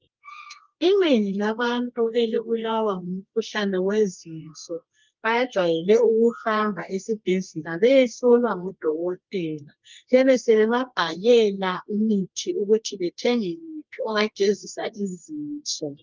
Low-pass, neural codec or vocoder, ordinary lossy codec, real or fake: 7.2 kHz; codec, 24 kHz, 0.9 kbps, WavTokenizer, medium music audio release; Opus, 32 kbps; fake